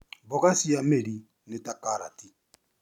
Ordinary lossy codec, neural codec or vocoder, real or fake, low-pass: none; none; real; 19.8 kHz